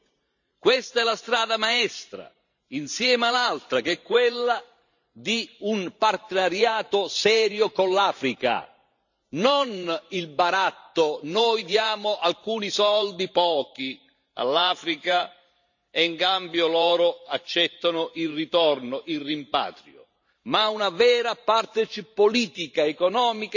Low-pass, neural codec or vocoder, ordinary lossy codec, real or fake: 7.2 kHz; vocoder, 44.1 kHz, 128 mel bands every 512 samples, BigVGAN v2; none; fake